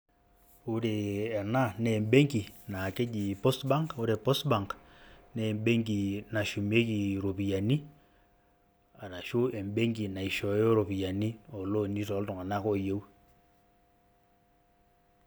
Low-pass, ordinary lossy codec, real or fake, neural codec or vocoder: none; none; real; none